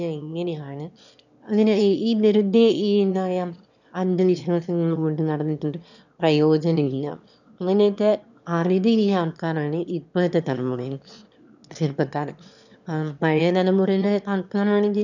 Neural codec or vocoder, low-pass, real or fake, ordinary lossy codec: autoencoder, 22.05 kHz, a latent of 192 numbers a frame, VITS, trained on one speaker; 7.2 kHz; fake; none